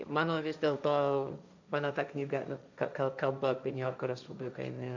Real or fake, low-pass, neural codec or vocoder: fake; 7.2 kHz; codec, 16 kHz, 1.1 kbps, Voila-Tokenizer